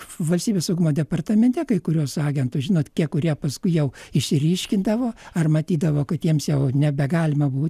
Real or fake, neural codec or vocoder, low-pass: real; none; 14.4 kHz